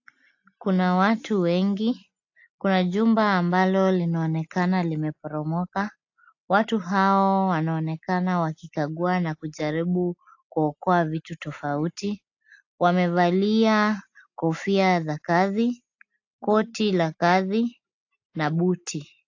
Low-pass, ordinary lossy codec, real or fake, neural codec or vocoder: 7.2 kHz; AAC, 48 kbps; real; none